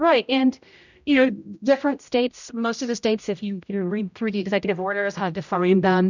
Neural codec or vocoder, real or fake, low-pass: codec, 16 kHz, 0.5 kbps, X-Codec, HuBERT features, trained on general audio; fake; 7.2 kHz